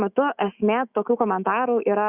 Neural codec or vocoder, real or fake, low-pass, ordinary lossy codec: autoencoder, 48 kHz, 128 numbers a frame, DAC-VAE, trained on Japanese speech; fake; 3.6 kHz; Opus, 64 kbps